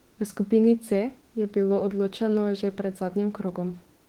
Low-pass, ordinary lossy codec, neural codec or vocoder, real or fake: 19.8 kHz; Opus, 16 kbps; autoencoder, 48 kHz, 32 numbers a frame, DAC-VAE, trained on Japanese speech; fake